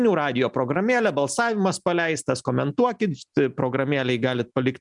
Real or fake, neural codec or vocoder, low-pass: real; none; 10.8 kHz